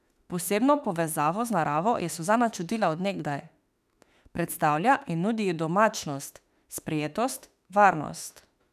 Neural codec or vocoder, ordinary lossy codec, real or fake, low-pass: autoencoder, 48 kHz, 32 numbers a frame, DAC-VAE, trained on Japanese speech; none; fake; 14.4 kHz